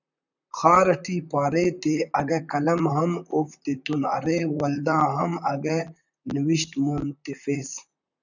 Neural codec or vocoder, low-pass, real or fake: vocoder, 44.1 kHz, 128 mel bands, Pupu-Vocoder; 7.2 kHz; fake